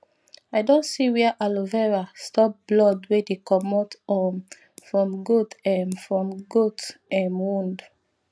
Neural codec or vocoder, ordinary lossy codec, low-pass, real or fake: none; none; none; real